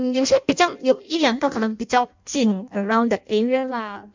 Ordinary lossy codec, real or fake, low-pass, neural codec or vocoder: MP3, 64 kbps; fake; 7.2 kHz; codec, 16 kHz in and 24 kHz out, 0.6 kbps, FireRedTTS-2 codec